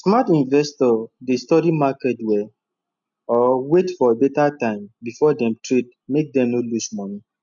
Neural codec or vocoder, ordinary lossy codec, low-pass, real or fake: none; none; 7.2 kHz; real